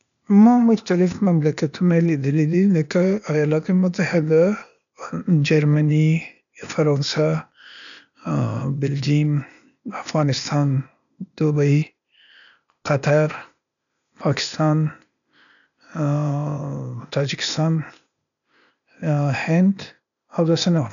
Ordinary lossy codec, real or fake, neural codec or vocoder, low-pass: none; fake; codec, 16 kHz, 0.8 kbps, ZipCodec; 7.2 kHz